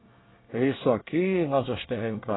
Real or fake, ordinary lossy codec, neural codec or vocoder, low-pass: fake; AAC, 16 kbps; codec, 24 kHz, 1 kbps, SNAC; 7.2 kHz